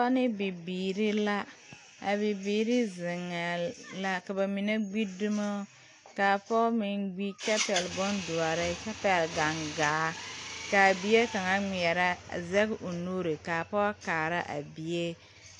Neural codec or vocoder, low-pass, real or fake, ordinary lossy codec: none; 10.8 kHz; real; AAC, 48 kbps